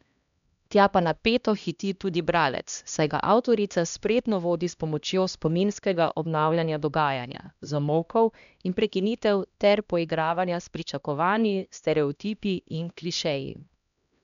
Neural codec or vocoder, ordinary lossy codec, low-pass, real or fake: codec, 16 kHz, 1 kbps, X-Codec, HuBERT features, trained on LibriSpeech; none; 7.2 kHz; fake